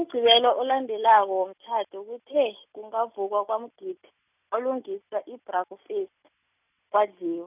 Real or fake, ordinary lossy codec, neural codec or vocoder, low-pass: real; none; none; 3.6 kHz